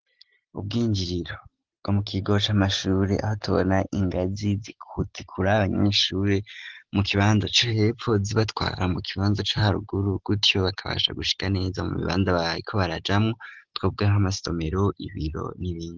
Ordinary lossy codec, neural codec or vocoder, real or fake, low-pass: Opus, 16 kbps; none; real; 7.2 kHz